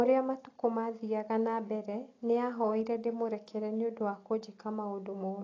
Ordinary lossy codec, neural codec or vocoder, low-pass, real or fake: AAC, 32 kbps; none; 7.2 kHz; real